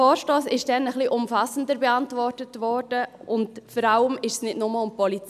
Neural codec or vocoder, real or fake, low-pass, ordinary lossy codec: none; real; 14.4 kHz; none